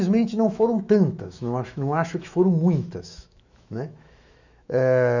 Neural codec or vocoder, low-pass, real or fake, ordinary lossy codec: autoencoder, 48 kHz, 128 numbers a frame, DAC-VAE, trained on Japanese speech; 7.2 kHz; fake; none